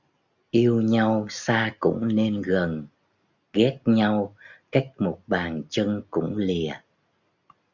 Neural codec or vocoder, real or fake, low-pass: none; real; 7.2 kHz